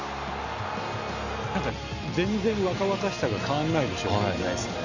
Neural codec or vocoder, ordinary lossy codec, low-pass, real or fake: none; none; 7.2 kHz; real